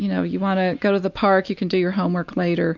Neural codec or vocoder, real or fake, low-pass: none; real; 7.2 kHz